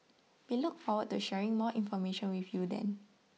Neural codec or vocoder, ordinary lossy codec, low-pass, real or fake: none; none; none; real